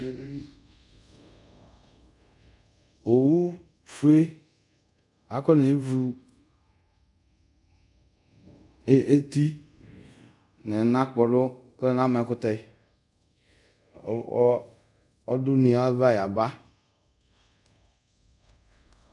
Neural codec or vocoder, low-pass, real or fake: codec, 24 kHz, 0.5 kbps, DualCodec; 10.8 kHz; fake